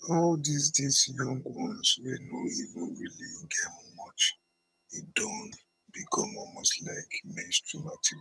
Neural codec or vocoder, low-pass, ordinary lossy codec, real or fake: vocoder, 22.05 kHz, 80 mel bands, HiFi-GAN; none; none; fake